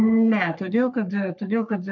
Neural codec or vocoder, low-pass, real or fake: codec, 44.1 kHz, 3.4 kbps, Pupu-Codec; 7.2 kHz; fake